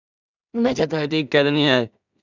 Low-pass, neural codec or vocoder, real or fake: 7.2 kHz; codec, 16 kHz in and 24 kHz out, 0.4 kbps, LongCat-Audio-Codec, two codebook decoder; fake